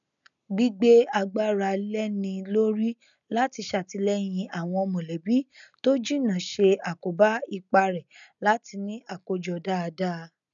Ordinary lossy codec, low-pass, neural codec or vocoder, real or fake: none; 7.2 kHz; none; real